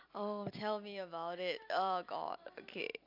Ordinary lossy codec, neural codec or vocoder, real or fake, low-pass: MP3, 48 kbps; vocoder, 44.1 kHz, 128 mel bands every 256 samples, BigVGAN v2; fake; 5.4 kHz